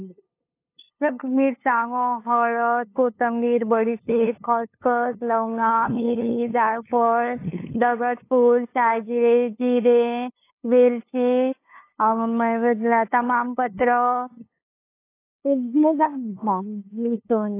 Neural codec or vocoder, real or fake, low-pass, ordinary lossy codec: codec, 16 kHz, 2 kbps, FunCodec, trained on LibriTTS, 25 frames a second; fake; 3.6 kHz; AAC, 24 kbps